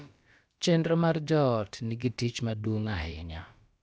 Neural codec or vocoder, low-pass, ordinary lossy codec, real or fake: codec, 16 kHz, about 1 kbps, DyCAST, with the encoder's durations; none; none; fake